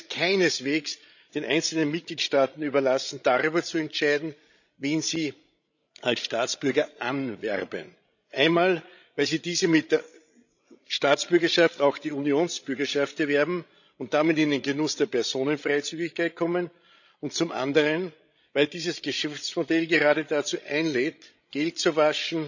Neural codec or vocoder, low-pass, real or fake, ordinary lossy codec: codec, 16 kHz, 16 kbps, FreqCodec, larger model; 7.2 kHz; fake; none